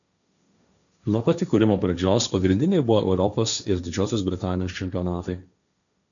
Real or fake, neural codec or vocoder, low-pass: fake; codec, 16 kHz, 1.1 kbps, Voila-Tokenizer; 7.2 kHz